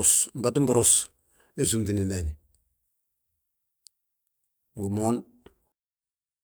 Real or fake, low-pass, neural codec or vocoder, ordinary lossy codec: fake; none; codec, 44.1 kHz, 2.6 kbps, SNAC; none